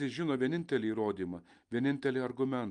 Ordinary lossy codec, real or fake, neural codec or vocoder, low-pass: Opus, 32 kbps; real; none; 10.8 kHz